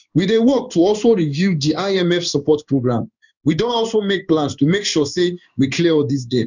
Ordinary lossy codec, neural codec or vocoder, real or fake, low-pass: none; codec, 16 kHz in and 24 kHz out, 1 kbps, XY-Tokenizer; fake; 7.2 kHz